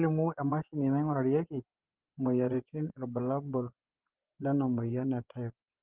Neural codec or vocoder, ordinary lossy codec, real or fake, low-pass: vocoder, 24 kHz, 100 mel bands, Vocos; Opus, 16 kbps; fake; 3.6 kHz